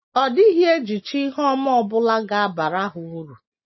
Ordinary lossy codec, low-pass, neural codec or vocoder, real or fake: MP3, 24 kbps; 7.2 kHz; none; real